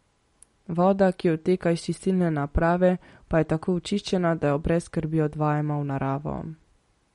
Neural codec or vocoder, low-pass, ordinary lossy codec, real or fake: none; 14.4 kHz; MP3, 48 kbps; real